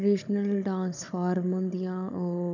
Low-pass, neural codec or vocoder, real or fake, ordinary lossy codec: 7.2 kHz; codec, 16 kHz, 16 kbps, FunCodec, trained on Chinese and English, 50 frames a second; fake; none